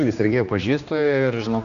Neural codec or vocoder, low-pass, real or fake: codec, 16 kHz, 2 kbps, X-Codec, HuBERT features, trained on general audio; 7.2 kHz; fake